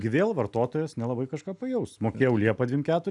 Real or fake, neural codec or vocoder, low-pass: real; none; 10.8 kHz